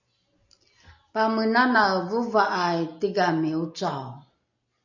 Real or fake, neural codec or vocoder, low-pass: real; none; 7.2 kHz